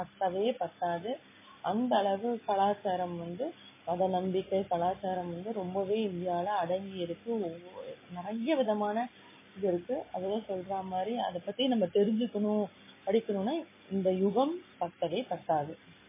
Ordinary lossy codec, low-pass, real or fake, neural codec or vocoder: MP3, 16 kbps; 3.6 kHz; real; none